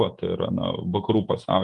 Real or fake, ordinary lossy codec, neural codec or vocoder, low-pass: fake; Opus, 32 kbps; vocoder, 48 kHz, 128 mel bands, Vocos; 10.8 kHz